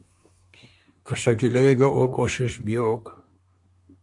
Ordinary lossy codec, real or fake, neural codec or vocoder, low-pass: AAC, 64 kbps; fake; codec, 24 kHz, 1 kbps, SNAC; 10.8 kHz